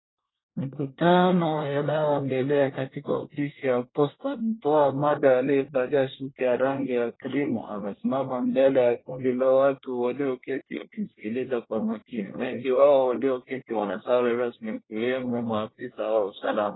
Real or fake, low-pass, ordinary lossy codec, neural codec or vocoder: fake; 7.2 kHz; AAC, 16 kbps; codec, 24 kHz, 1 kbps, SNAC